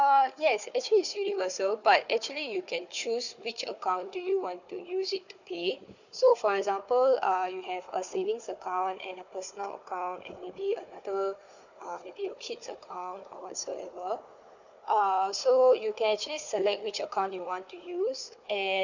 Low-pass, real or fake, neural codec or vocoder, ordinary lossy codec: 7.2 kHz; fake; codec, 16 kHz, 4 kbps, FunCodec, trained on Chinese and English, 50 frames a second; none